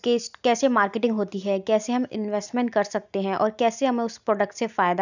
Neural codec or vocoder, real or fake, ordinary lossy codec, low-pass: none; real; none; 7.2 kHz